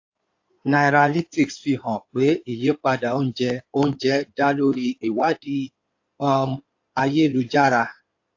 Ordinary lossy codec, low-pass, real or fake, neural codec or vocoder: AAC, 48 kbps; 7.2 kHz; fake; codec, 16 kHz in and 24 kHz out, 2.2 kbps, FireRedTTS-2 codec